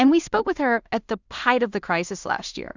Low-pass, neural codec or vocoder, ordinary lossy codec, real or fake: 7.2 kHz; codec, 16 kHz in and 24 kHz out, 0.4 kbps, LongCat-Audio-Codec, two codebook decoder; Opus, 64 kbps; fake